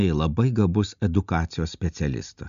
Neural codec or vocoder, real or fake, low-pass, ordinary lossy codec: none; real; 7.2 kHz; MP3, 96 kbps